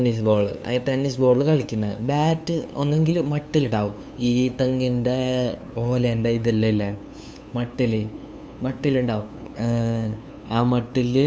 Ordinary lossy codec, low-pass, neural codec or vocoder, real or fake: none; none; codec, 16 kHz, 2 kbps, FunCodec, trained on LibriTTS, 25 frames a second; fake